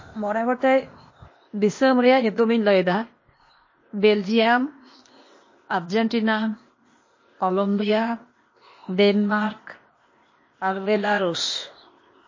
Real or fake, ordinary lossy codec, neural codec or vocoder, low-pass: fake; MP3, 32 kbps; codec, 16 kHz, 0.8 kbps, ZipCodec; 7.2 kHz